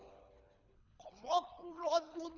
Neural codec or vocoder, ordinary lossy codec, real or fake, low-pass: codec, 24 kHz, 3 kbps, HILCodec; none; fake; 7.2 kHz